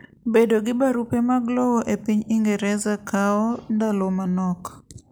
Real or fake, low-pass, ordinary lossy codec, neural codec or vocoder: real; none; none; none